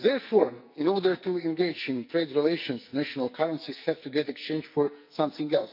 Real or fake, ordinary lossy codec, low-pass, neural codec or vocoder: fake; MP3, 48 kbps; 5.4 kHz; codec, 44.1 kHz, 2.6 kbps, SNAC